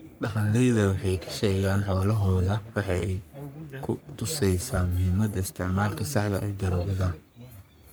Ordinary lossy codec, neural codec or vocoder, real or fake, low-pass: none; codec, 44.1 kHz, 3.4 kbps, Pupu-Codec; fake; none